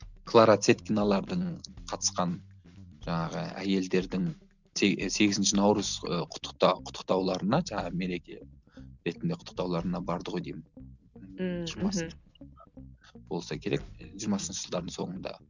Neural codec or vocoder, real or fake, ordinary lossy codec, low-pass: none; real; none; 7.2 kHz